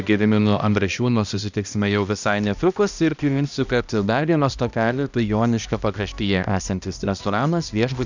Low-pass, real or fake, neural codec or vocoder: 7.2 kHz; fake; codec, 16 kHz, 1 kbps, X-Codec, HuBERT features, trained on balanced general audio